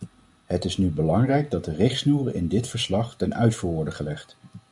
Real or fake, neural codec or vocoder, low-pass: real; none; 10.8 kHz